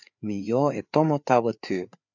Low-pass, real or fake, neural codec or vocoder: 7.2 kHz; fake; codec, 16 kHz, 4 kbps, FreqCodec, larger model